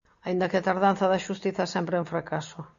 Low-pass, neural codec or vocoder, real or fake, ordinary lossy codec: 7.2 kHz; none; real; MP3, 96 kbps